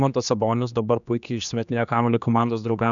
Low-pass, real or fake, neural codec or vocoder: 7.2 kHz; fake; codec, 16 kHz, 2 kbps, X-Codec, HuBERT features, trained on general audio